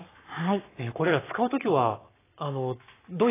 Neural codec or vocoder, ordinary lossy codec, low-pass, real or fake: codec, 44.1 kHz, 7.8 kbps, Pupu-Codec; AAC, 16 kbps; 3.6 kHz; fake